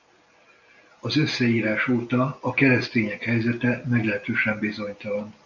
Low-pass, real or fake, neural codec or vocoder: 7.2 kHz; real; none